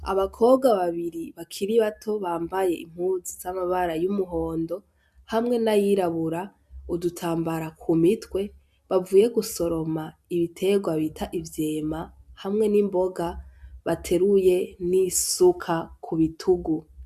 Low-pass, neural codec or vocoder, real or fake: 14.4 kHz; none; real